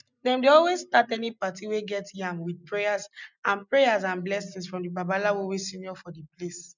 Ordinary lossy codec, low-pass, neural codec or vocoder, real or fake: none; 7.2 kHz; none; real